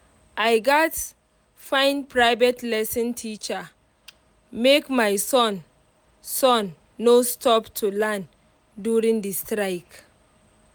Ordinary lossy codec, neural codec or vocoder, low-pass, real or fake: none; none; none; real